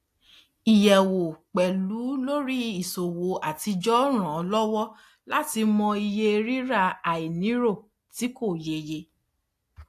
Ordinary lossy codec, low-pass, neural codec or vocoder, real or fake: AAC, 64 kbps; 14.4 kHz; none; real